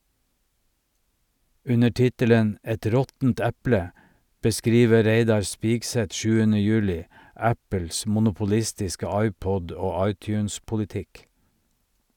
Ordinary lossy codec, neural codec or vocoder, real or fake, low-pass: none; none; real; 19.8 kHz